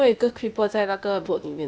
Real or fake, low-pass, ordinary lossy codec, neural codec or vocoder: fake; none; none; codec, 16 kHz, 0.8 kbps, ZipCodec